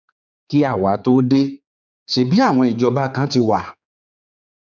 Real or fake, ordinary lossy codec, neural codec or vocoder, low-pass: fake; none; codec, 16 kHz, 4 kbps, X-Codec, HuBERT features, trained on general audio; 7.2 kHz